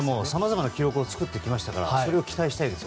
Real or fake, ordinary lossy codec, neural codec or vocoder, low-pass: real; none; none; none